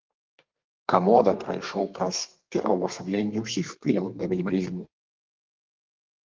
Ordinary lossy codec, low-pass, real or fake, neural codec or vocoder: Opus, 32 kbps; 7.2 kHz; fake; codec, 32 kHz, 1.9 kbps, SNAC